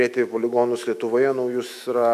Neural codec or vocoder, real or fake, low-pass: autoencoder, 48 kHz, 128 numbers a frame, DAC-VAE, trained on Japanese speech; fake; 14.4 kHz